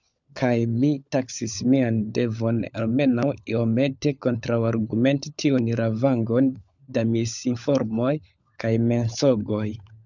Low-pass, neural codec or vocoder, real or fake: 7.2 kHz; codec, 16 kHz, 16 kbps, FunCodec, trained on LibriTTS, 50 frames a second; fake